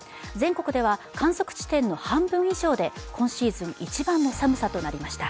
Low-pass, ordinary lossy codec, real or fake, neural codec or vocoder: none; none; real; none